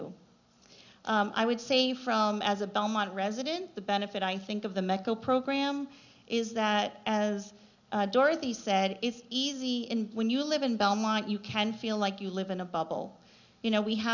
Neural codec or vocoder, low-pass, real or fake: none; 7.2 kHz; real